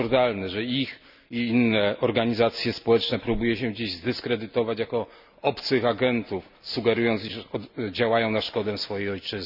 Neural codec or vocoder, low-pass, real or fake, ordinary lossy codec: none; 5.4 kHz; real; none